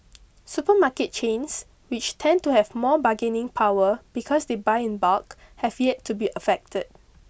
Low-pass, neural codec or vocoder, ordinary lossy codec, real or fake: none; none; none; real